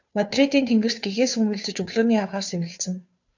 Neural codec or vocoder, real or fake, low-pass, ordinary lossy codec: codec, 16 kHz, 2 kbps, FunCodec, trained on Chinese and English, 25 frames a second; fake; 7.2 kHz; AAC, 48 kbps